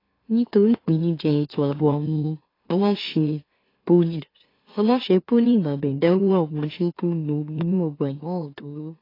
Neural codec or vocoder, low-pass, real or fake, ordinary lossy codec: autoencoder, 44.1 kHz, a latent of 192 numbers a frame, MeloTTS; 5.4 kHz; fake; AAC, 24 kbps